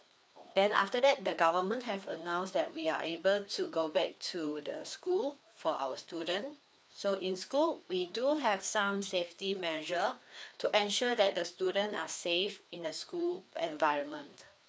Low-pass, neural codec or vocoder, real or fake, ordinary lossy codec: none; codec, 16 kHz, 2 kbps, FreqCodec, larger model; fake; none